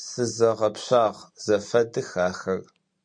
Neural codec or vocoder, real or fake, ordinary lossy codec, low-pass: none; real; MP3, 48 kbps; 9.9 kHz